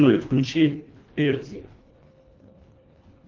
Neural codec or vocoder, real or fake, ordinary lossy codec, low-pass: codec, 24 kHz, 1.5 kbps, HILCodec; fake; Opus, 32 kbps; 7.2 kHz